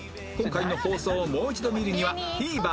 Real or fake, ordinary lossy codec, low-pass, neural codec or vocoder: real; none; none; none